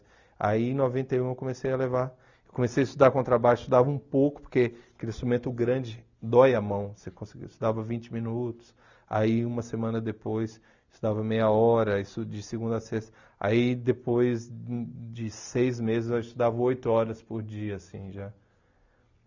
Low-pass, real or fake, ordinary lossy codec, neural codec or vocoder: 7.2 kHz; real; none; none